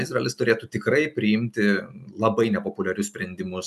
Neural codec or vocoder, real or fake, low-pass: none; real; 14.4 kHz